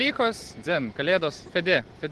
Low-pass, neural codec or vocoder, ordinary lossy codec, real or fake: 10.8 kHz; vocoder, 44.1 kHz, 128 mel bands every 512 samples, BigVGAN v2; Opus, 16 kbps; fake